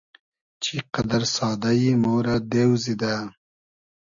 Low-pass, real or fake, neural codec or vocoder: 7.2 kHz; real; none